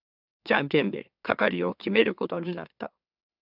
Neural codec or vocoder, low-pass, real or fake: autoencoder, 44.1 kHz, a latent of 192 numbers a frame, MeloTTS; 5.4 kHz; fake